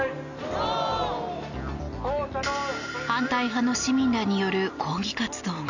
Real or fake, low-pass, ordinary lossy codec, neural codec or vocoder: real; 7.2 kHz; none; none